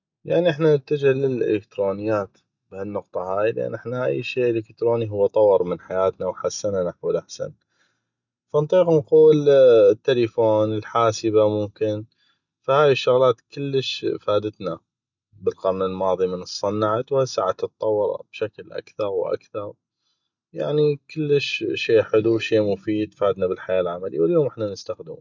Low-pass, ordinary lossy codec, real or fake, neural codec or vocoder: 7.2 kHz; none; real; none